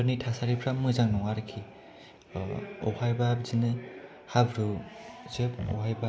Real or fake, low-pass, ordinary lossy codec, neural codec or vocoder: real; none; none; none